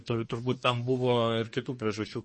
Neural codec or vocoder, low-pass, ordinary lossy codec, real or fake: codec, 24 kHz, 1 kbps, SNAC; 10.8 kHz; MP3, 32 kbps; fake